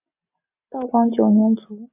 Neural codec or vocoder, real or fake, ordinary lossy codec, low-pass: none; real; AAC, 32 kbps; 3.6 kHz